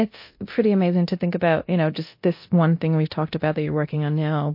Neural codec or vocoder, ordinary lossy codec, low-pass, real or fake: codec, 24 kHz, 0.9 kbps, DualCodec; MP3, 32 kbps; 5.4 kHz; fake